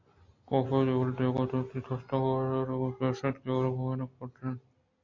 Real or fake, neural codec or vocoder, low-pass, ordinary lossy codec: real; none; 7.2 kHz; AAC, 48 kbps